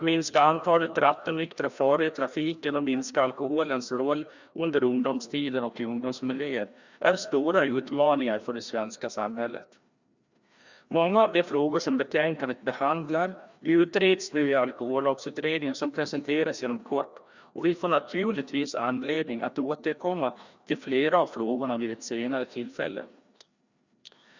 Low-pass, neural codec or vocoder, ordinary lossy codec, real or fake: 7.2 kHz; codec, 16 kHz, 1 kbps, FreqCodec, larger model; Opus, 64 kbps; fake